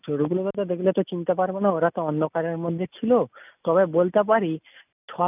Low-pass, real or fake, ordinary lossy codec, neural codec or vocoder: 3.6 kHz; real; none; none